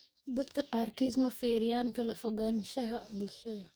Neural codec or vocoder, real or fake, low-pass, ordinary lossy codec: codec, 44.1 kHz, 2.6 kbps, DAC; fake; none; none